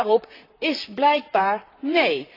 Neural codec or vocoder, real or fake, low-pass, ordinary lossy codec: vocoder, 44.1 kHz, 128 mel bands, Pupu-Vocoder; fake; 5.4 kHz; AAC, 24 kbps